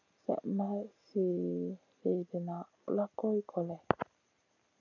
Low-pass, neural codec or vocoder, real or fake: 7.2 kHz; none; real